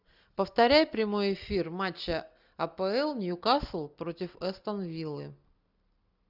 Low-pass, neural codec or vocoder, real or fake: 5.4 kHz; none; real